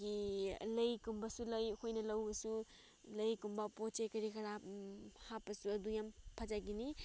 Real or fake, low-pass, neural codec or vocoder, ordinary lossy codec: real; none; none; none